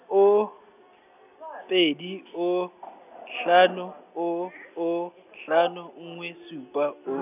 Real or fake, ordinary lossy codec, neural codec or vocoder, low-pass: real; none; none; 3.6 kHz